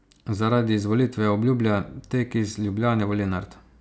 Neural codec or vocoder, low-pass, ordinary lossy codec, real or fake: none; none; none; real